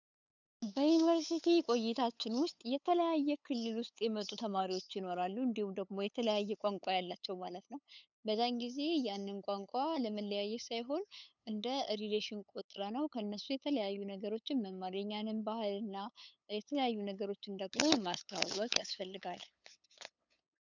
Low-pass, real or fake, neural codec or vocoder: 7.2 kHz; fake; codec, 16 kHz, 8 kbps, FunCodec, trained on LibriTTS, 25 frames a second